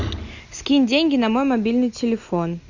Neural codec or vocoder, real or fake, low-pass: none; real; 7.2 kHz